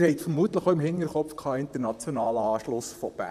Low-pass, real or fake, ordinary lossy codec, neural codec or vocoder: 14.4 kHz; fake; none; vocoder, 44.1 kHz, 128 mel bands, Pupu-Vocoder